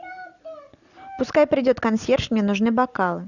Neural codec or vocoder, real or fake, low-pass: none; real; 7.2 kHz